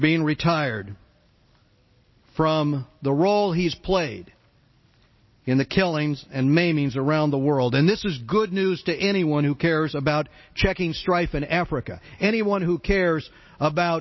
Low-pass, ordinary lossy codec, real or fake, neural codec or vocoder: 7.2 kHz; MP3, 24 kbps; real; none